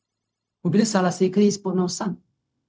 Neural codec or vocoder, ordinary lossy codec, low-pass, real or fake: codec, 16 kHz, 0.4 kbps, LongCat-Audio-Codec; none; none; fake